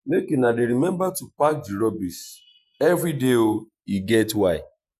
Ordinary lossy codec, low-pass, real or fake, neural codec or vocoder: none; 14.4 kHz; fake; vocoder, 48 kHz, 128 mel bands, Vocos